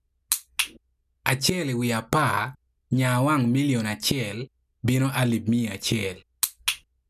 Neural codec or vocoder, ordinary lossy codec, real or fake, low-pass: none; none; real; 14.4 kHz